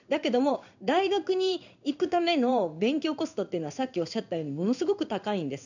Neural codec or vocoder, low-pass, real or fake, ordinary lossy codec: codec, 16 kHz in and 24 kHz out, 1 kbps, XY-Tokenizer; 7.2 kHz; fake; none